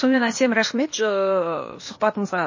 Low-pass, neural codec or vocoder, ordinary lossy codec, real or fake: 7.2 kHz; codec, 16 kHz, 0.8 kbps, ZipCodec; MP3, 32 kbps; fake